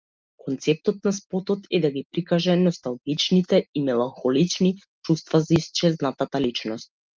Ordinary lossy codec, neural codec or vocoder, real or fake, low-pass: Opus, 32 kbps; none; real; 7.2 kHz